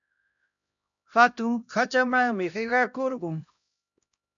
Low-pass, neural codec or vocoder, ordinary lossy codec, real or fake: 7.2 kHz; codec, 16 kHz, 1 kbps, X-Codec, HuBERT features, trained on LibriSpeech; MP3, 96 kbps; fake